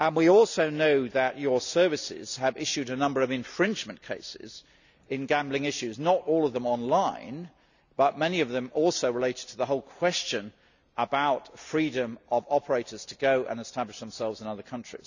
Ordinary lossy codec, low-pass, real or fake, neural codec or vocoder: none; 7.2 kHz; real; none